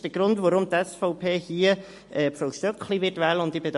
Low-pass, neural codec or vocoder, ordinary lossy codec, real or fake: 14.4 kHz; none; MP3, 48 kbps; real